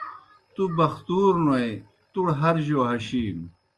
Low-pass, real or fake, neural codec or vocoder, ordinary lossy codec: 10.8 kHz; real; none; Opus, 32 kbps